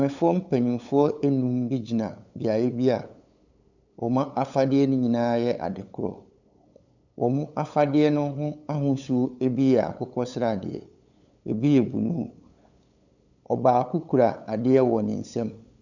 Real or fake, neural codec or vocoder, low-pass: fake; codec, 16 kHz, 8 kbps, FunCodec, trained on LibriTTS, 25 frames a second; 7.2 kHz